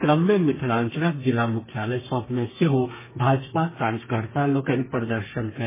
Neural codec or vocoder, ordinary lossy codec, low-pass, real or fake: codec, 32 kHz, 1.9 kbps, SNAC; MP3, 16 kbps; 3.6 kHz; fake